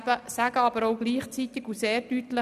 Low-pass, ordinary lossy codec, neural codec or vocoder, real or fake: 14.4 kHz; none; none; real